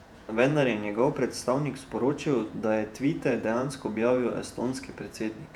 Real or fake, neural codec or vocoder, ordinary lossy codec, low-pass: fake; vocoder, 48 kHz, 128 mel bands, Vocos; none; 19.8 kHz